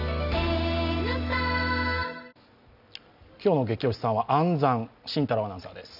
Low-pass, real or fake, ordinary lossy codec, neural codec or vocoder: 5.4 kHz; real; none; none